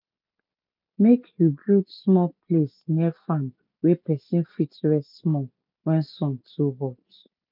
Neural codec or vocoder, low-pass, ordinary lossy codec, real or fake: none; 5.4 kHz; MP3, 48 kbps; real